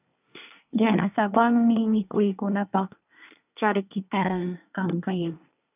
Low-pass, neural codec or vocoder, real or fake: 3.6 kHz; codec, 24 kHz, 1 kbps, SNAC; fake